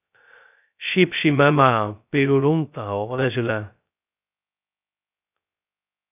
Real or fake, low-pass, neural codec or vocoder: fake; 3.6 kHz; codec, 16 kHz, 0.2 kbps, FocalCodec